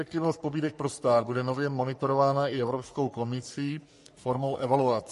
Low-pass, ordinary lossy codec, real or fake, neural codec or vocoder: 14.4 kHz; MP3, 48 kbps; fake; codec, 44.1 kHz, 3.4 kbps, Pupu-Codec